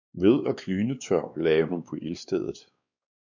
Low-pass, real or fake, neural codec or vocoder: 7.2 kHz; fake; codec, 16 kHz, 2 kbps, X-Codec, WavLM features, trained on Multilingual LibriSpeech